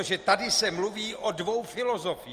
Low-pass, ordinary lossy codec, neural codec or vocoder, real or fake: 14.4 kHz; Opus, 32 kbps; none; real